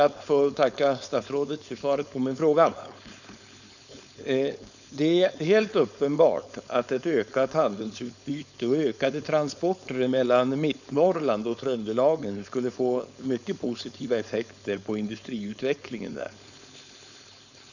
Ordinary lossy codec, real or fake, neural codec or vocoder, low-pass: none; fake; codec, 16 kHz, 4.8 kbps, FACodec; 7.2 kHz